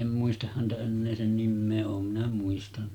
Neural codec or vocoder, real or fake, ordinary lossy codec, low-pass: none; real; none; 19.8 kHz